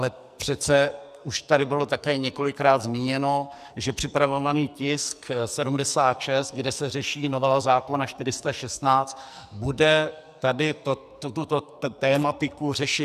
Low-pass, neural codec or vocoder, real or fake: 14.4 kHz; codec, 44.1 kHz, 2.6 kbps, SNAC; fake